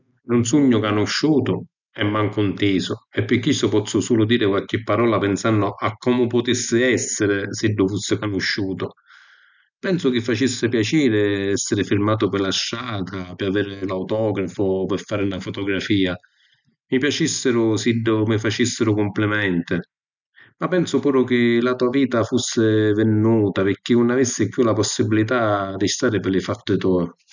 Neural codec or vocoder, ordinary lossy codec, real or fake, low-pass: none; none; real; 7.2 kHz